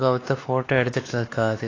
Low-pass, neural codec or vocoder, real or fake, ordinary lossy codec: 7.2 kHz; codec, 16 kHz, 2 kbps, X-Codec, WavLM features, trained on Multilingual LibriSpeech; fake; AAC, 32 kbps